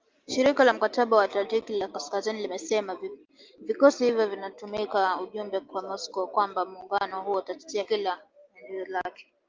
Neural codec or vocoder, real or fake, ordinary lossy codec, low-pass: none; real; Opus, 32 kbps; 7.2 kHz